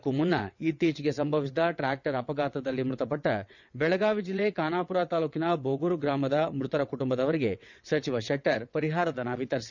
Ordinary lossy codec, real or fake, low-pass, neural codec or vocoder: none; fake; 7.2 kHz; vocoder, 22.05 kHz, 80 mel bands, WaveNeXt